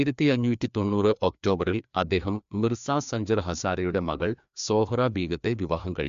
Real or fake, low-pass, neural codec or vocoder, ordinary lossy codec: fake; 7.2 kHz; codec, 16 kHz, 2 kbps, FreqCodec, larger model; MP3, 64 kbps